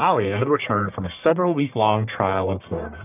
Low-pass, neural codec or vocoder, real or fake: 3.6 kHz; codec, 44.1 kHz, 1.7 kbps, Pupu-Codec; fake